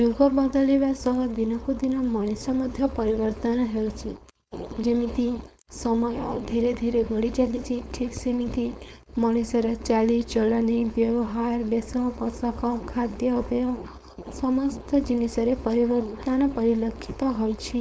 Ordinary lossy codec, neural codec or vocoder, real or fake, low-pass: none; codec, 16 kHz, 4.8 kbps, FACodec; fake; none